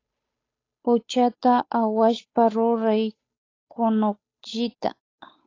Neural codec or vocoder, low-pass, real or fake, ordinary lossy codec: codec, 16 kHz, 8 kbps, FunCodec, trained on Chinese and English, 25 frames a second; 7.2 kHz; fake; AAC, 32 kbps